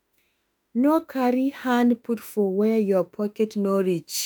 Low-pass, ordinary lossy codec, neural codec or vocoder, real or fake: none; none; autoencoder, 48 kHz, 32 numbers a frame, DAC-VAE, trained on Japanese speech; fake